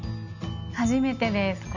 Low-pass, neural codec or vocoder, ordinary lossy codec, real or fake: 7.2 kHz; none; none; real